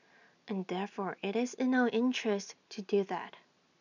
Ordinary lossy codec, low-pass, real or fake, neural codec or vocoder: none; 7.2 kHz; real; none